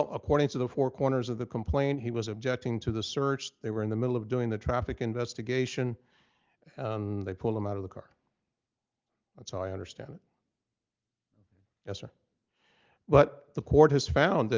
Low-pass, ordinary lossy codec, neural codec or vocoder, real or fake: 7.2 kHz; Opus, 32 kbps; none; real